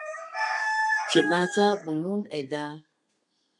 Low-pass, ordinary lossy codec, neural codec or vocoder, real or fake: 10.8 kHz; MP3, 64 kbps; codec, 32 kHz, 1.9 kbps, SNAC; fake